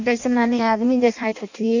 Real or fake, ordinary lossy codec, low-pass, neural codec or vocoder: fake; none; 7.2 kHz; codec, 16 kHz in and 24 kHz out, 0.6 kbps, FireRedTTS-2 codec